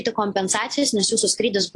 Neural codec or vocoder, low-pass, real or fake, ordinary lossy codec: none; 10.8 kHz; real; AAC, 48 kbps